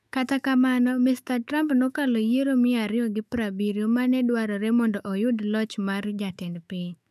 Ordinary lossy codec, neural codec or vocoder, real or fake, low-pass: none; autoencoder, 48 kHz, 128 numbers a frame, DAC-VAE, trained on Japanese speech; fake; 14.4 kHz